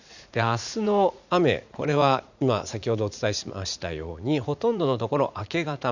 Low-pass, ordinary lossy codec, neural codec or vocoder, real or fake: 7.2 kHz; none; vocoder, 44.1 kHz, 80 mel bands, Vocos; fake